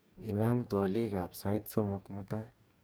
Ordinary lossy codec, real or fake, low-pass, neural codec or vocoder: none; fake; none; codec, 44.1 kHz, 2.6 kbps, DAC